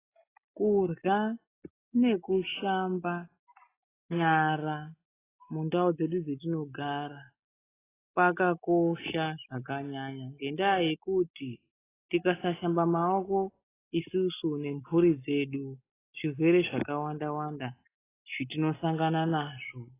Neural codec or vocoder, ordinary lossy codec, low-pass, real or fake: none; AAC, 16 kbps; 3.6 kHz; real